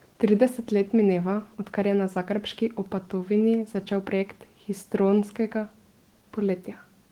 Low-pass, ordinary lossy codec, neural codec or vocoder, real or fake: 19.8 kHz; Opus, 16 kbps; autoencoder, 48 kHz, 128 numbers a frame, DAC-VAE, trained on Japanese speech; fake